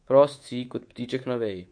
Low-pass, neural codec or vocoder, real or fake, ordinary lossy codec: 9.9 kHz; codec, 24 kHz, 3.1 kbps, DualCodec; fake; MP3, 48 kbps